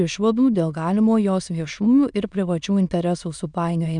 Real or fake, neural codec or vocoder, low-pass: fake; autoencoder, 22.05 kHz, a latent of 192 numbers a frame, VITS, trained on many speakers; 9.9 kHz